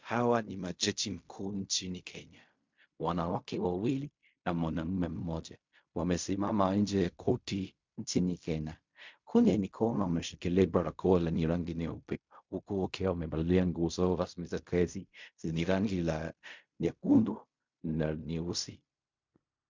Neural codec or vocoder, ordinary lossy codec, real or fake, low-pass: codec, 16 kHz in and 24 kHz out, 0.4 kbps, LongCat-Audio-Codec, fine tuned four codebook decoder; MP3, 64 kbps; fake; 7.2 kHz